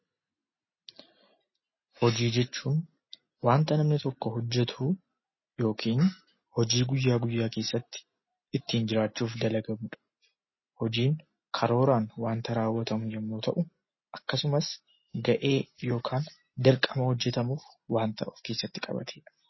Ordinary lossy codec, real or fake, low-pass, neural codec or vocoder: MP3, 24 kbps; real; 7.2 kHz; none